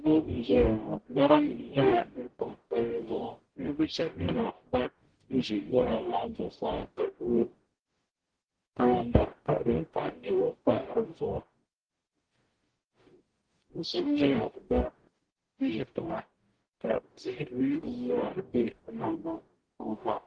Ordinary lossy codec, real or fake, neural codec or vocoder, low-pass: Opus, 16 kbps; fake; codec, 44.1 kHz, 0.9 kbps, DAC; 9.9 kHz